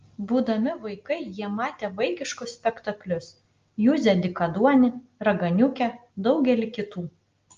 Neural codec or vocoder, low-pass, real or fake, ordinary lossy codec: none; 7.2 kHz; real; Opus, 32 kbps